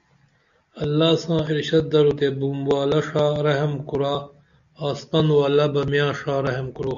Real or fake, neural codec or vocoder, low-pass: real; none; 7.2 kHz